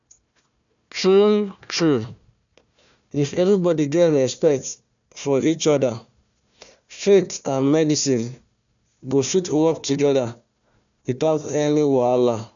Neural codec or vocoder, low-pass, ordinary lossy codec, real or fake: codec, 16 kHz, 1 kbps, FunCodec, trained on Chinese and English, 50 frames a second; 7.2 kHz; none; fake